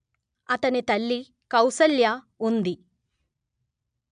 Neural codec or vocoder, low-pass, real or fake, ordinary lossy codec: none; 9.9 kHz; real; none